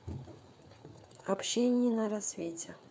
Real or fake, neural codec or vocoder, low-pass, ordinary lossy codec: fake; codec, 16 kHz, 4 kbps, FreqCodec, larger model; none; none